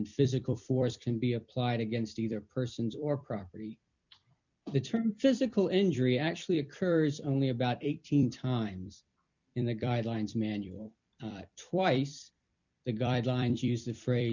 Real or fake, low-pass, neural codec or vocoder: fake; 7.2 kHz; vocoder, 44.1 kHz, 128 mel bands every 256 samples, BigVGAN v2